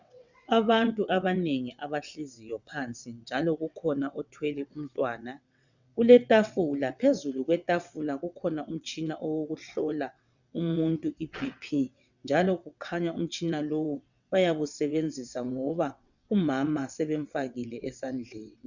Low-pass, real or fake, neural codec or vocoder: 7.2 kHz; fake; vocoder, 22.05 kHz, 80 mel bands, WaveNeXt